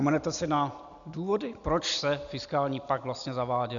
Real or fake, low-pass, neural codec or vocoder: real; 7.2 kHz; none